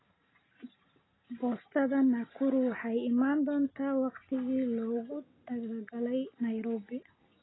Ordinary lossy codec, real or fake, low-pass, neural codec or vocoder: AAC, 16 kbps; real; 7.2 kHz; none